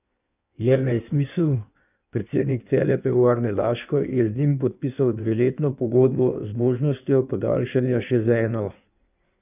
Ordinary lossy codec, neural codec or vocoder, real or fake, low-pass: none; codec, 16 kHz in and 24 kHz out, 1.1 kbps, FireRedTTS-2 codec; fake; 3.6 kHz